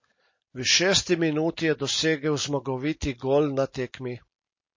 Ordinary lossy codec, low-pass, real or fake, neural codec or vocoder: MP3, 32 kbps; 7.2 kHz; real; none